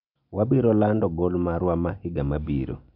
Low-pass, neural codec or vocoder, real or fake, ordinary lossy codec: 5.4 kHz; none; real; none